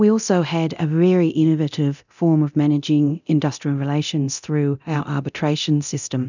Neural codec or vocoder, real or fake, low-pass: codec, 24 kHz, 0.9 kbps, DualCodec; fake; 7.2 kHz